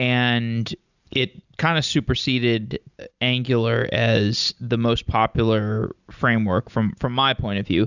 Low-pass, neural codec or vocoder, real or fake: 7.2 kHz; none; real